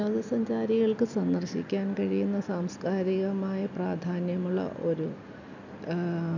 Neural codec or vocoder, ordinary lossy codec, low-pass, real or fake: none; none; 7.2 kHz; real